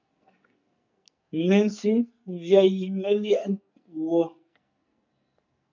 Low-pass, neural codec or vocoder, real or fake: 7.2 kHz; codec, 44.1 kHz, 2.6 kbps, SNAC; fake